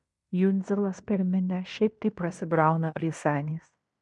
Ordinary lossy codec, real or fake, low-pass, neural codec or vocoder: MP3, 96 kbps; fake; 10.8 kHz; codec, 16 kHz in and 24 kHz out, 0.9 kbps, LongCat-Audio-Codec, fine tuned four codebook decoder